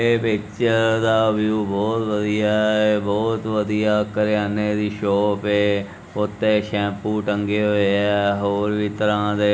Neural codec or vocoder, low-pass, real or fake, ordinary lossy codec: none; none; real; none